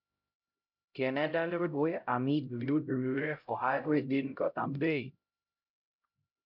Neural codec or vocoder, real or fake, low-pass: codec, 16 kHz, 0.5 kbps, X-Codec, HuBERT features, trained on LibriSpeech; fake; 5.4 kHz